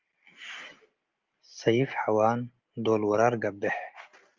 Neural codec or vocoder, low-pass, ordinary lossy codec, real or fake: none; 7.2 kHz; Opus, 32 kbps; real